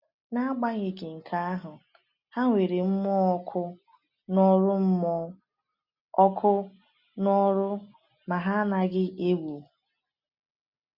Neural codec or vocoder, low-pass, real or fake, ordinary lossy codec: none; 5.4 kHz; real; none